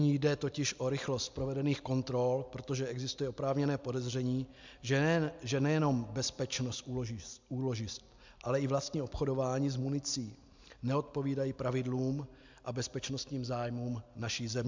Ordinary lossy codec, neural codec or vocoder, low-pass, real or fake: MP3, 64 kbps; none; 7.2 kHz; real